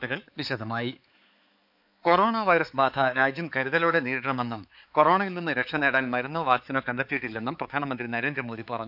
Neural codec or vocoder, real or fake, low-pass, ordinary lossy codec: codec, 16 kHz, 4 kbps, X-Codec, HuBERT features, trained on balanced general audio; fake; 5.4 kHz; none